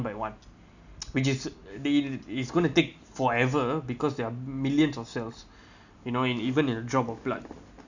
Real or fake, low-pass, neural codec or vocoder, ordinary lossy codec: real; 7.2 kHz; none; none